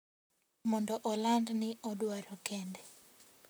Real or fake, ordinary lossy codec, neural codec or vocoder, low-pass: fake; none; vocoder, 44.1 kHz, 128 mel bands, Pupu-Vocoder; none